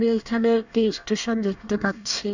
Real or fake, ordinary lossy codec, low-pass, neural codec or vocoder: fake; none; 7.2 kHz; codec, 24 kHz, 1 kbps, SNAC